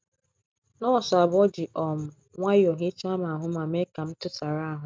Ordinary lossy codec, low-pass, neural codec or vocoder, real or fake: none; none; none; real